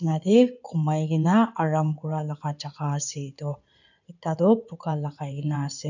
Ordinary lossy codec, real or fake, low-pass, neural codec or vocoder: none; fake; 7.2 kHz; codec, 16 kHz in and 24 kHz out, 2.2 kbps, FireRedTTS-2 codec